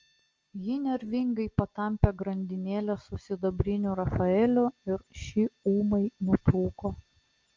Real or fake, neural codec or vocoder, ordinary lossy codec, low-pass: real; none; Opus, 24 kbps; 7.2 kHz